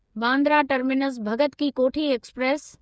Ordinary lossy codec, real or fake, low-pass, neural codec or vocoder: none; fake; none; codec, 16 kHz, 8 kbps, FreqCodec, smaller model